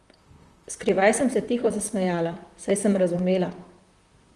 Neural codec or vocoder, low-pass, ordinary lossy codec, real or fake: vocoder, 44.1 kHz, 128 mel bands, Pupu-Vocoder; 10.8 kHz; Opus, 24 kbps; fake